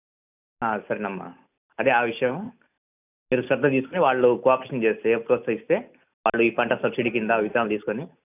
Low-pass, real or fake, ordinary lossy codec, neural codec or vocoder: 3.6 kHz; real; none; none